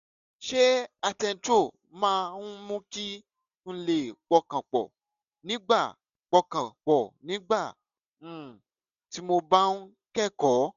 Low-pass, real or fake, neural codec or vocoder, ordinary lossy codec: 7.2 kHz; real; none; none